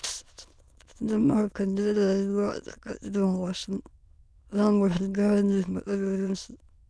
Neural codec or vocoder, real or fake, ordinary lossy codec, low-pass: autoencoder, 22.05 kHz, a latent of 192 numbers a frame, VITS, trained on many speakers; fake; none; none